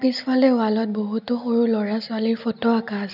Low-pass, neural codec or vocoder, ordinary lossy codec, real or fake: 5.4 kHz; none; none; real